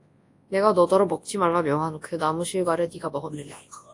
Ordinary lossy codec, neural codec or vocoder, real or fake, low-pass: AAC, 48 kbps; codec, 24 kHz, 0.9 kbps, WavTokenizer, large speech release; fake; 10.8 kHz